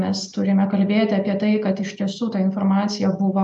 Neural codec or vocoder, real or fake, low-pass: none; real; 10.8 kHz